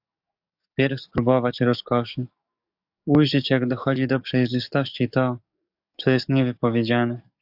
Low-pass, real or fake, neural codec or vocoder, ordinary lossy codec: 5.4 kHz; fake; codec, 44.1 kHz, 7.8 kbps, DAC; AAC, 48 kbps